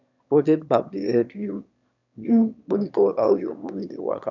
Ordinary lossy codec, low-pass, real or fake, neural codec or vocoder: none; 7.2 kHz; fake; autoencoder, 22.05 kHz, a latent of 192 numbers a frame, VITS, trained on one speaker